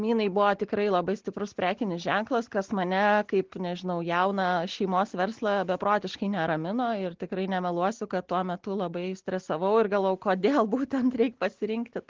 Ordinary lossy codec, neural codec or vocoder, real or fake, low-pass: Opus, 16 kbps; none; real; 7.2 kHz